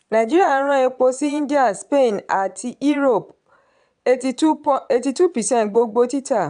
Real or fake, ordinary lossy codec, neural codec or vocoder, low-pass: fake; none; vocoder, 22.05 kHz, 80 mel bands, Vocos; 9.9 kHz